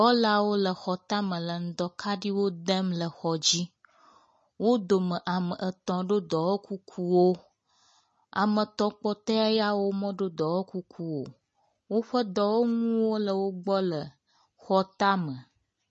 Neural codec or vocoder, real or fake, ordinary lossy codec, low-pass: none; real; MP3, 32 kbps; 10.8 kHz